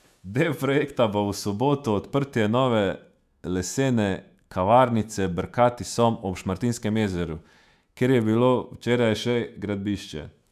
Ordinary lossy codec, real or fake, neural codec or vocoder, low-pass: none; fake; autoencoder, 48 kHz, 128 numbers a frame, DAC-VAE, trained on Japanese speech; 14.4 kHz